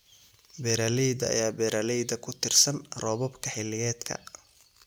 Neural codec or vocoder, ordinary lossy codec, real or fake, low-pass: none; none; real; none